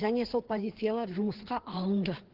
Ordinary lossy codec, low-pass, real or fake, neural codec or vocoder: Opus, 16 kbps; 5.4 kHz; fake; codec, 16 kHz, 4 kbps, FreqCodec, larger model